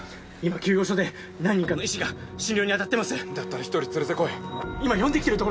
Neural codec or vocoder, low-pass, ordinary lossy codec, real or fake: none; none; none; real